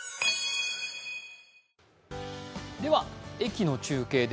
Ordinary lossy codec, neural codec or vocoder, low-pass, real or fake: none; none; none; real